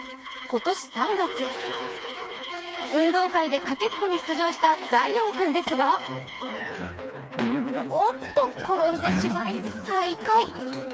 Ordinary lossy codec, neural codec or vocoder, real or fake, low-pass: none; codec, 16 kHz, 2 kbps, FreqCodec, smaller model; fake; none